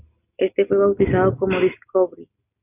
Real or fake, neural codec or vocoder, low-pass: real; none; 3.6 kHz